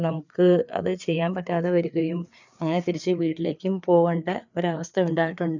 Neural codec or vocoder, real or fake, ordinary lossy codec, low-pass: codec, 16 kHz, 4 kbps, FreqCodec, larger model; fake; none; 7.2 kHz